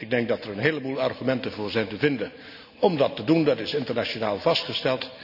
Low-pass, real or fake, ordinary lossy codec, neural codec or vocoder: 5.4 kHz; real; none; none